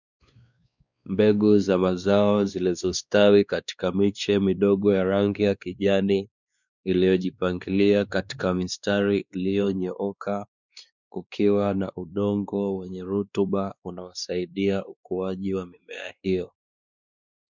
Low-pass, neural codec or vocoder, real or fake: 7.2 kHz; codec, 16 kHz, 2 kbps, X-Codec, WavLM features, trained on Multilingual LibriSpeech; fake